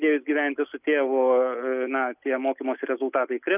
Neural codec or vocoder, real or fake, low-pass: none; real; 3.6 kHz